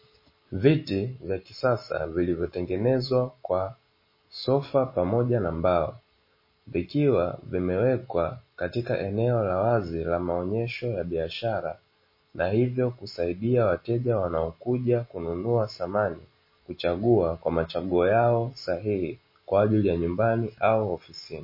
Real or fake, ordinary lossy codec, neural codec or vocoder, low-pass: real; MP3, 24 kbps; none; 5.4 kHz